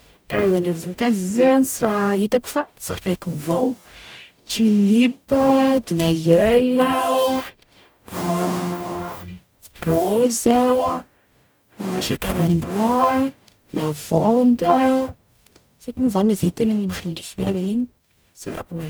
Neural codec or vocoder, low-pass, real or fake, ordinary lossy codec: codec, 44.1 kHz, 0.9 kbps, DAC; none; fake; none